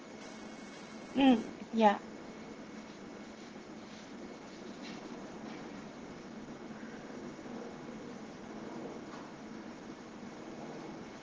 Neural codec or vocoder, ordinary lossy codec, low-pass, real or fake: none; Opus, 16 kbps; 7.2 kHz; real